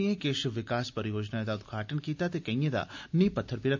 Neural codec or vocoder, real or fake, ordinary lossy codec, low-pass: none; real; MP3, 64 kbps; 7.2 kHz